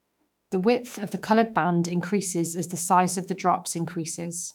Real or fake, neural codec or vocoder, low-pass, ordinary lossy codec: fake; autoencoder, 48 kHz, 32 numbers a frame, DAC-VAE, trained on Japanese speech; 19.8 kHz; none